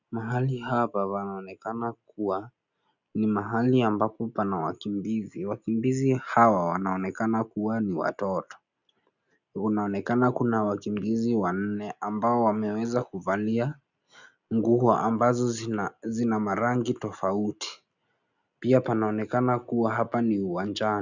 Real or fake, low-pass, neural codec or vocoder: real; 7.2 kHz; none